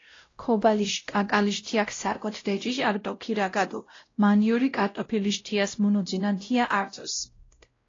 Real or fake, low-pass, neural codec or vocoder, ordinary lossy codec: fake; 7.2 kHz; codec, 16 kHz, 0.5 kbps, X-Codec, WavLM features, trained on Multilingual LibriSpeech; AAC, 32 kbps